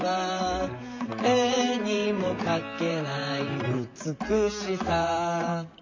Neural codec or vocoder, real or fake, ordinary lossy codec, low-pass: vocoder, 44.1 kHz, 128 mel bands, Pupu-Vocoder; fake; MP3, 48 kbps; 7.2 kHz